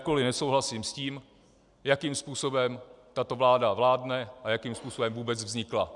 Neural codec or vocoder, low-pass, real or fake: none; 10.8 kHz; real